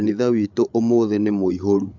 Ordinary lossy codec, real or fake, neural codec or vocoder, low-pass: MP3, 64 kbps; fake; codec, 16 kHz, 8 kbps, FreqCodec, larger model; 7.2 kHz